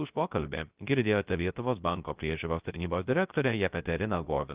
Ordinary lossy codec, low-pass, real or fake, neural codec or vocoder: Opus, 24 kbps; 3.6 kHz; fake; codec, 16 kHz, 0.3 kbps, FocalCodec